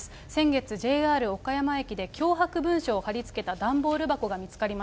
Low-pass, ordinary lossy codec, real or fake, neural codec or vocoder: none; none; real; none